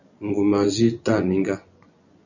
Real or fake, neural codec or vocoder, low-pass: fake; vocoder, 24 kHz, 100 mel bands, Vocos; 7.2 kHz